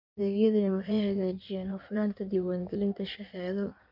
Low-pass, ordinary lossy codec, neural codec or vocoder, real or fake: 5.4 kHz; none; codec, 16 kHz in and 24 kHz out, 1.1 kbps, FireRedTTS-2 codec; fake